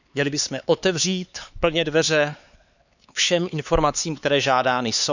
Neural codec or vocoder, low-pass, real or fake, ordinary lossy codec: codec, 16 kHz, 4 kbps, X-Codec, HuBERT features, trained on LibriSpeech; 7.2 kHz; fake; none